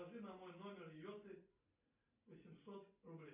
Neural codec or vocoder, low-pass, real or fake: none; 3.6 kHz; real